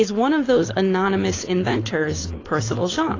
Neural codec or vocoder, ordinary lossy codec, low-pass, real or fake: codec, 16 kHz, 4.8 kbps, FACodec; AAC, 32 kbps; 7.2 kHz; fake